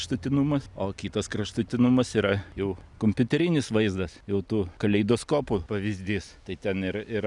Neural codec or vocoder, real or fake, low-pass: none; real; 10.8 kHz